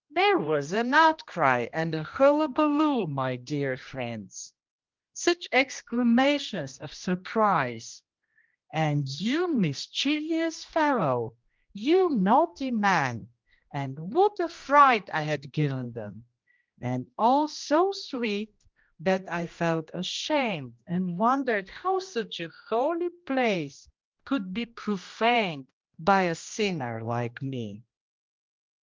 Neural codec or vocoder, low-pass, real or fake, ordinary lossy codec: codec, 16 kHz, 1 kbps, X-Codec, HuBERT features, trained on general audio; 7.2 kHz; fake; Opus, 24 kbps